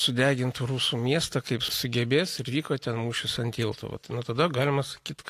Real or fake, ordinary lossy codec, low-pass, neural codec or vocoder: real; AAC, 64 kbps; 14.4 kHz; none